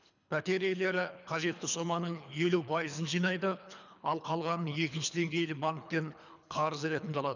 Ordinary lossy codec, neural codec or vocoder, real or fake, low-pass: none; codec, 24 kHz, 3 kbps, HILCodec; fake; 7.2 kHz